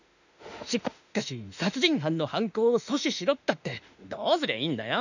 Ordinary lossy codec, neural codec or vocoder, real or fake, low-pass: none; autoencoder, 48 kHz, 32 numbers a frame, DAC-VAE, trained on Japanese speech; fake; 7.2 kHz